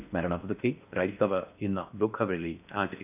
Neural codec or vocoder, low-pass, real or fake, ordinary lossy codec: codec, 16 kHz in and 24 kHz out, 0.6 kbps, FocalCodec, streaming, 4096 codes; 3.6 kHz; fake; none